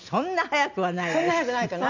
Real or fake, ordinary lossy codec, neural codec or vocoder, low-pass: real; none; none; 7.2 kHz